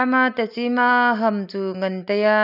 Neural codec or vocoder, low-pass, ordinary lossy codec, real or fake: none; 5.4 kHz; none; real